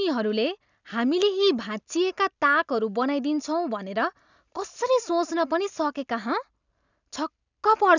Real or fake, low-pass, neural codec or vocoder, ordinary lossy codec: real; 7.2 kHz; none; none